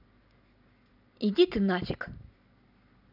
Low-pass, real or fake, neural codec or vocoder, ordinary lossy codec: 5.4 kHz; real; none; none